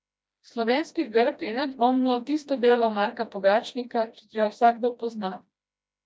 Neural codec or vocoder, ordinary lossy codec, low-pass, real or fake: codec, 16 kHz, 1 kbps, FreqCodec, smaller model; none; none; fake